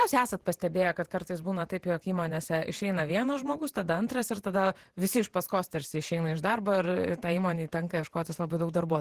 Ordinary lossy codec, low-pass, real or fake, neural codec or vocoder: Opus, 16 kbps; 14.4 kHz; fake; vocoder, 48 kHz, 128 mel bands, Vocos